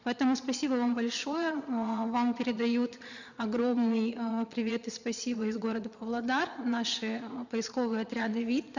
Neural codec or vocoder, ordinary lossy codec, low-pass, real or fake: vocoder, 22.05 kHz, 80 mel bands, Vocos; none; 7.2 kHz; fake